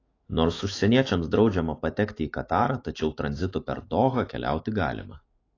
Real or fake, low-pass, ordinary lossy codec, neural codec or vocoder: fake; 7.2 kHz; AAC, 32 kbps; autoencoder, 48 kHz, 128 numbers a frame, DAC-VAE, trained on Japanese speech